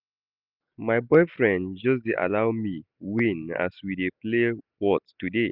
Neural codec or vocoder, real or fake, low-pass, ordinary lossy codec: none; real; 5.4 kHz; none